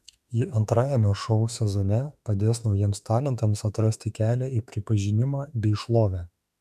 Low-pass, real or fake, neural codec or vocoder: 14.4 kHz; fake; autoencoder, 48 kHz, 32 numbers a frame, DAC-VAE, trained on Japanese speech